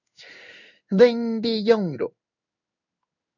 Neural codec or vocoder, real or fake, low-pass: codec, 16 kHz in and 24 kHz out, 1 kbps, XY-Tokenizer; fake; 7.2 kHz